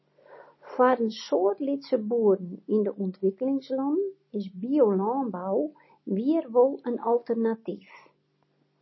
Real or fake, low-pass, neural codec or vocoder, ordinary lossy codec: real; 7.2 kHz; none; MP3, 24 kbps